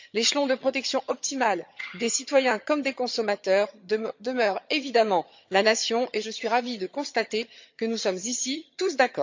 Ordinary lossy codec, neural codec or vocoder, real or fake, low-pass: MP3, 64 kbps; vocoder, 22.05 kHz, 80 mel bands, HiFi-GAN; fake; 7.2 kHz